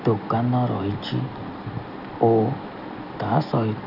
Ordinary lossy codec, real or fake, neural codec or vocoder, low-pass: none; real; none; 5.4 kHz